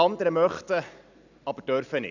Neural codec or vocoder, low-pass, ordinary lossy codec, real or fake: none; 7.2 kHz; none; real